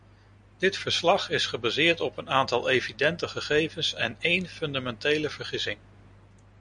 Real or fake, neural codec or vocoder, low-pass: real; none; 9.9 kHz